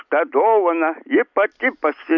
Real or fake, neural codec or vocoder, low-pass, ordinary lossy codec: real; none; 7.2 kHz; MP3, 64 kbps